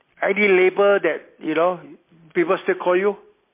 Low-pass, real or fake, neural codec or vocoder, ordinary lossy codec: 3.6 kHz; real; none; MP3, 24 kbps